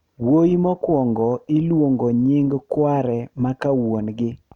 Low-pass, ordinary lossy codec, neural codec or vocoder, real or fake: 19.8 kHz; none; none; real